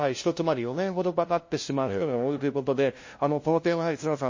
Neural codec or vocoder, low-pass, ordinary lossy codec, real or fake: codec, 16 kHz, 0.5 kbps, FunCodec, trained on LibriTTS, 25 frames a second; 7.2 kHz; MP3, 32 kbps; fake